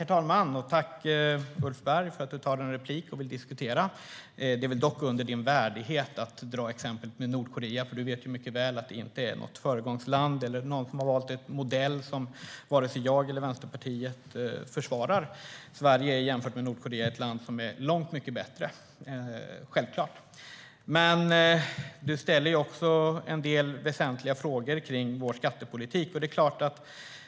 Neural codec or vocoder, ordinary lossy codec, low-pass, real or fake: none; none; none; real